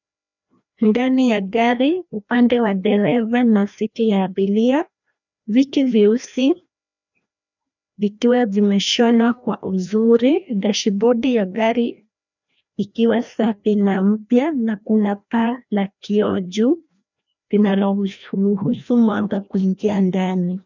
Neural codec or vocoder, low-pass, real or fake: codec, 16 kHz, 1 kbps, FreqCodec, larger model; 7.2 kHz; fake